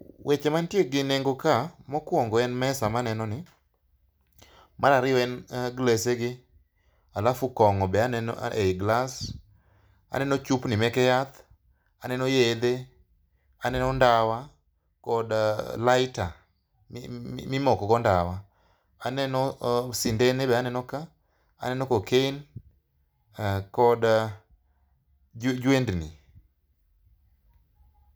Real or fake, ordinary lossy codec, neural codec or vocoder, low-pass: real; none; none; none